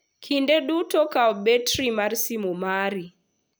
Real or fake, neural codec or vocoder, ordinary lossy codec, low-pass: real; none; none; none